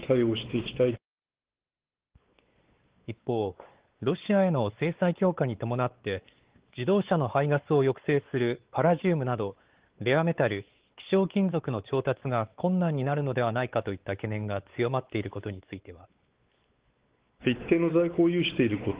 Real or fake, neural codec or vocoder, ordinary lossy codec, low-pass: fake; codec, 16 kHz, 4 kbps, X-Codec, WavLM features, trained on Multilingual LibriSpeech; Opus, 16 kbps; 3.6 kHz